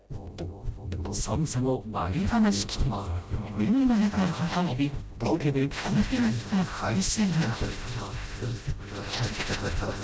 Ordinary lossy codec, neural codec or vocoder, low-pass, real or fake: none; codec, 16 kHz, 0.5 kbps, FreqCodec, smaller model; none; fake